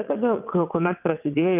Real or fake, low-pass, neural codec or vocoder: fake; 3.6 kHz; vocoder, 22.05 kHz, 80 mel bands, WaveNeXt